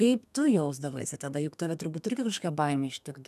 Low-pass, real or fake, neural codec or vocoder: 14.4 kHz; fake; codec, 32 kHz, 1.9 kbps, SNAC